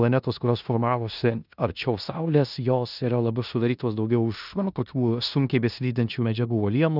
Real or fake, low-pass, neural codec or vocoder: fake; 5.4 kHz; codec, 16 kHz in and 24 kHz out, 0.9 kbps, LongCat-Audio-Codec, four codebook decoder